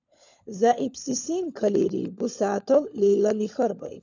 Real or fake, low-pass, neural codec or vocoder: fake; 7.2 kHz; codec, 16 kHz, 16 kbps, FunCodec, trained on LibriTTS, 50 frames a second